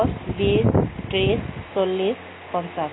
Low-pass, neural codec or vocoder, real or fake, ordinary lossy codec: 7.2 kHz; none; real; AAC, 16 kbps